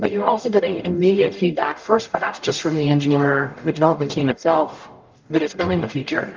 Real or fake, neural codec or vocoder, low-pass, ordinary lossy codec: fake; codec, 44.1 kHz, 0.9 kbps, DAC; 7.2 kHz; Opus, 32 kbps